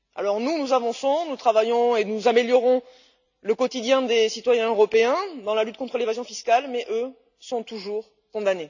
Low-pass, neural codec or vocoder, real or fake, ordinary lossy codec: 7.2 kHz; none; real; none